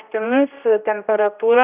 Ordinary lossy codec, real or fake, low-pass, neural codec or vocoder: AAC, 32 kbps; fake; 3.6 kHz; codec, 16 kHz, 1 kbps, X-Codec, HuBERT features, trained on general audio